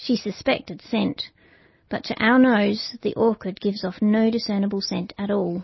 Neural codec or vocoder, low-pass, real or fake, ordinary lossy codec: none; 7.2 kHz; real; MP3, 24 kbps